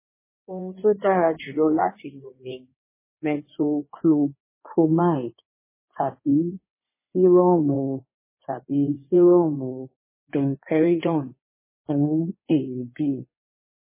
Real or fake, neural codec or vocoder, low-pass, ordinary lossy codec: fake; codec, 16 kHz in and 24 kHz out, 2.2 kbps, FireRedTTS-2 codec; 3.6 kHz; MP3, 16 kbps